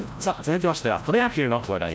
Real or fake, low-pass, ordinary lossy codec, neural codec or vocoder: fake; none; none; codec, 16 kHz, 0.5 kbps, FreqCodec, larger model